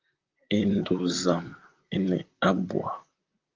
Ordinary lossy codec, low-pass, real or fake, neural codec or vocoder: Opus, 32 kbps; 7.2 kHz; fake; vocoder, 44.1 kHz, 128 mel bands, Pupu-Vocoder